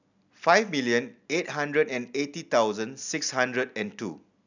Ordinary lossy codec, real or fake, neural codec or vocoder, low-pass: none; real; none; 7.2 kHz